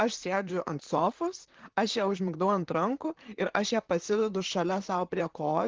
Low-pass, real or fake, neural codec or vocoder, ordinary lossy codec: 7.2 kHz; fake; vocoder, 44.1 kHz, 128 mel bands, Pupu-Vocoder; Opus, 16 kbps